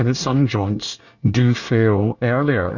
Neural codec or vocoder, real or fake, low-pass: codec, 24 kHz, 1 kbps, SNAC; fake; 7.2 kHz